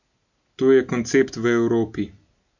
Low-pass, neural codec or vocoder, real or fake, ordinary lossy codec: 7.2 kHz; none; real; none